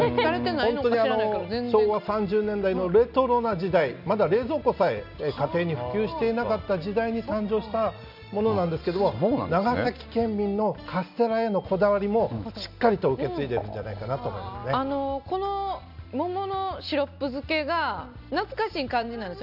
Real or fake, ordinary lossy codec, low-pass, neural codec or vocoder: real; none; 5.4 kHz; none